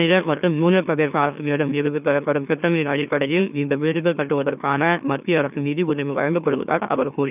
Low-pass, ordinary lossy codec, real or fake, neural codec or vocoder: 3.6 kHz; none; fake; autoencoder, 44.1 kHz, a latent of 192 numbers a frame, MeloTTS